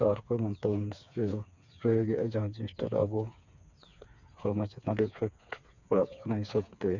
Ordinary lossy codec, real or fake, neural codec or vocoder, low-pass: none; fake; codec, 16 kHz, 4 kbps, FreqCodec, smaller model; 7.2 kHz